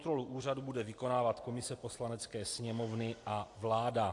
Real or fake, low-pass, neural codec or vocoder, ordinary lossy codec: real; 10.8 kHz; none; AAC, 48 kbps